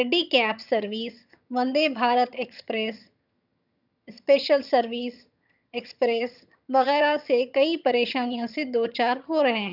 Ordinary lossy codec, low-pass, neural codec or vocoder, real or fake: none; 5.4 kHz; vocoder, 22.05 kHz, 80 mel bands, HiFi-GAN; fake